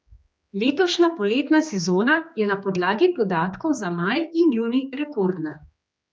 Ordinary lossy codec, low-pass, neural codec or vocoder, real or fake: none; none; codec, 16 kHz, 2 kbps, X-Codec, HuBERT features, trained on general audio; fake